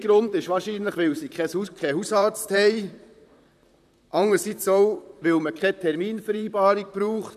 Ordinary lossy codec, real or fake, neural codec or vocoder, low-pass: none; fake; vocoder, 44.1 kHz, 128 mel bands, Pupu-Vocoder; 14.4 kHz